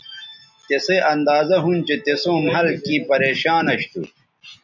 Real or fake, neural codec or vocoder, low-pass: real; none; 7.2 kHz